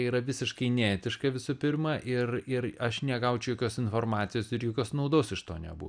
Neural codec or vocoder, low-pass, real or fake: none; 9.9 kHz; real